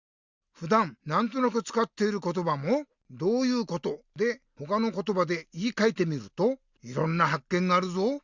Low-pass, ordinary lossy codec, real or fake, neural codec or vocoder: 7.2 kHz; Opus, 64 kbps; real; none